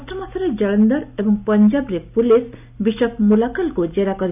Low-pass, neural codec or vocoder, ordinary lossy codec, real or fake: 3.6 kHz; none; none; real